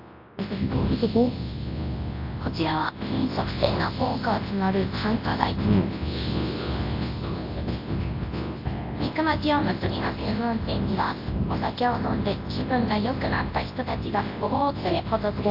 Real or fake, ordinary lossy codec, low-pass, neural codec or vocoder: fake; AAC, 32 kbps; 5.4 kHz; codec, 24 kHz, 0.9 kbps, WavTokenizer, large speech release